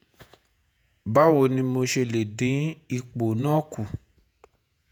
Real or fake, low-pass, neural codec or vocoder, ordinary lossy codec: fake; none; vocoder, 48 kHz, 128 mel bands, Vocos; none